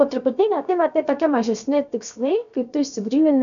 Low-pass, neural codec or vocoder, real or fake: 7.2 kHz; codec, 16 kHz, 0.7 kbps, FocalCodec; fake